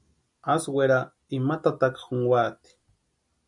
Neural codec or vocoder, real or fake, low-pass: none; real; 10.8 kHz